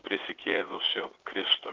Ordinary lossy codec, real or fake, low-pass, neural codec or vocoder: Opus, 24 kbps; fake; 7.2 kHz; vocoder, 44.1 kHz, 80 mel bands, Vocos